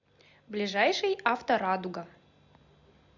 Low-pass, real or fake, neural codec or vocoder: 7.2 kHz; real; none